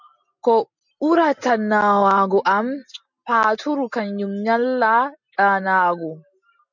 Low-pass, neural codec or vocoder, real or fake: 7.2 kHz; none; real